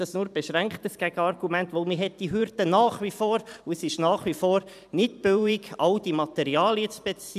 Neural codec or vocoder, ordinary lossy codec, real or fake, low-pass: vocoder, 44.1 kHz, 128 mel bands every 512 samples, BigVGAN v2; none; fake; 14.4 kHz